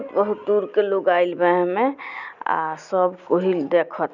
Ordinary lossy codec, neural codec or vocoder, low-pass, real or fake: none; none; 7.2 kHz; real